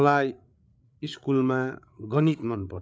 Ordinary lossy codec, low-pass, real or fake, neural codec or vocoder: none; none; fake; codec, 16 kHz, 8 kbps, FreqCodec, larger model